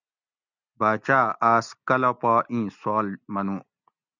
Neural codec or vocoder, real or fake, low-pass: none; real; 7.2 kHz